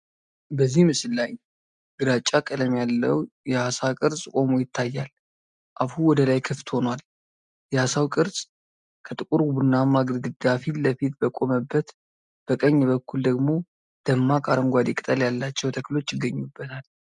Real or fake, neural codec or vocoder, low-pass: real; none; 10.8 kHz